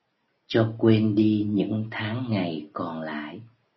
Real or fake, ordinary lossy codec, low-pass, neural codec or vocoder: real; MP3, 24 kbps; 7.2 kHz; none